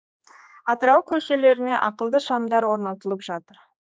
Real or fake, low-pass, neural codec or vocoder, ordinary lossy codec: fake; none; codec, 16 kHz, 2 kbps, X-Codec, HuBERT features, trained on general audio; none